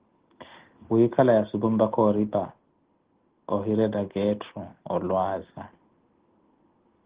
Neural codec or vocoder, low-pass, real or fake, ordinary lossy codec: none; 3.6 kHz; real; Opus, 16 kbps